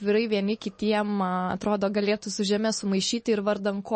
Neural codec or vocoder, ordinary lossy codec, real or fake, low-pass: none; MP3, 32 kbps; real; 10.8 kHz